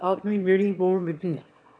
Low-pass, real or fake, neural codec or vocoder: 9.9 kHz; fake; autoencoder, 22.05 kHz, a latent of 192 numbers a frame, VITS, trained on one speaker